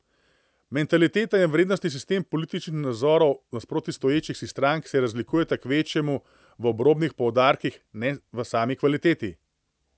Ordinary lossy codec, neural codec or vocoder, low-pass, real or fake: none; none; none; real